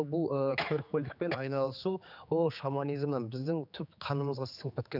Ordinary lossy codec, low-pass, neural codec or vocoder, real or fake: none; 5.4 kHz; codec, 16 kHz, 4 kbps, X-Codec, HuBERT features, trained on general audio; fake